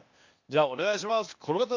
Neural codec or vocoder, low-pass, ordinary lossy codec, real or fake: codec, 16 kHz, 0.8 kbps, ZipCodec; 7.2 kHz; MP3, 48 kbps; fake